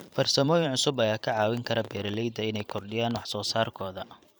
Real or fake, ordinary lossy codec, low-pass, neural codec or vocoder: fake; none; none; vocoder, 44.1 kHz, 128 mel bands every 512 samples, BigVGAN v2